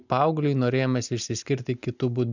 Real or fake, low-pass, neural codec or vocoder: real; 7.2 kHz; none